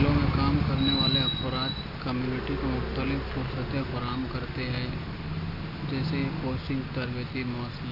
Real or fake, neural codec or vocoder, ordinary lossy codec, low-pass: real; none; none; 5.4 kHz